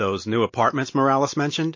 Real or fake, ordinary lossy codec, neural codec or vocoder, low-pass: real; MP3, 32 kbps; none; 7.2 kHz